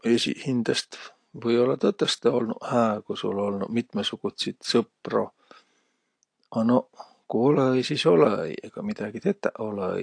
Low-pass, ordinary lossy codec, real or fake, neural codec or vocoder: 9.9 kHz; AAC, 48 kbps; real; none